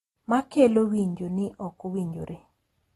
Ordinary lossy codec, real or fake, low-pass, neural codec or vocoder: AAC, 32 kbps; real; 19.8 kHz; none